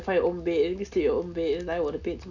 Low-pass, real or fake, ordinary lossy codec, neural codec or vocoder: 7.2 kHz; real; none; none